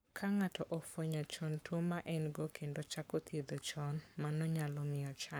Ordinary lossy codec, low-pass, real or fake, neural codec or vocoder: none; none; fake; codec, 44.1 kHz, 7.8 kbps, Pupu-Codec